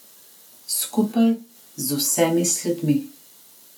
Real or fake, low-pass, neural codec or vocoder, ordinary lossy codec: real; none; none; none